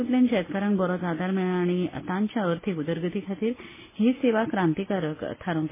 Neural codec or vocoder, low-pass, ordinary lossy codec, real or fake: none; 3.6 kHz; MP3, 16 kbps; real